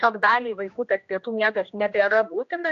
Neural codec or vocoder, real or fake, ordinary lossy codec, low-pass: codec, 16 kHz, 1 kbps, X-Codec, HuBERT features, trained on general audio; fake; AAC, 48 kbps; 7.2 kHz